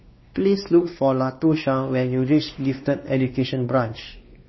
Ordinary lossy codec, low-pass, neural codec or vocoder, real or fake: MP3, 24 kbps; 7.2 kHz; codec, 16 kHz, 2 kbps, X-Codec, WavLM features, trained on Multilingual LibriSpeech; fake